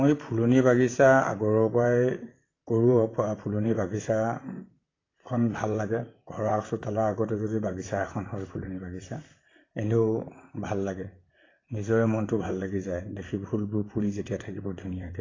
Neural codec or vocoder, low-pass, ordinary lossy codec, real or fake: vocoder, 44.1 kHz, 128 mel bands, Pupu-Vocoder; 7.2 kHz; AAC, 32 kbps; fake